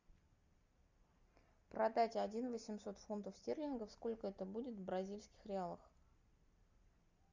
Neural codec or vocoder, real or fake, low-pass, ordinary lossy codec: none; real; 7.2 kHz; Opus, 64 kbps